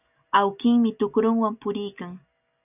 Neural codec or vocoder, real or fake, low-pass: none; real; 3.6 kHz